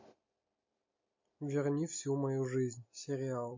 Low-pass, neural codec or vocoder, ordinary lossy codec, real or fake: 7.2 kHz; none; MP3, 32 kbps; real